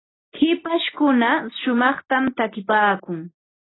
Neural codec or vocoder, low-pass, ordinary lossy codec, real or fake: none; 7.2 kHz; AAC, 16 kbps; real